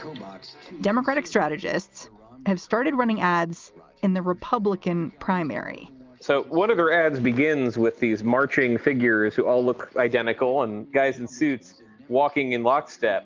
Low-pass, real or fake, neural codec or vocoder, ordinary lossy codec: 7.2 kHz; real; none; Opus, 24 kbps